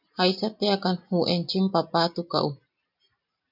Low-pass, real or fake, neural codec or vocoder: 5.4 kHz; real; none